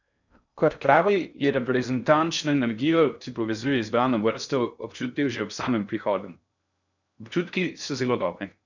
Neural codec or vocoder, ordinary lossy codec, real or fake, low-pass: codec, 16 kHz in and 24 kHz out, 0.6 kbps, FocalCodec, streaming, 2048 codes; none; fake; 7.2 kHz